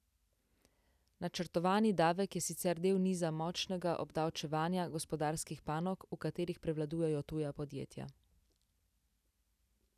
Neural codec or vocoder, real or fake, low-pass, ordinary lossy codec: none; real; 14.4 kHz; none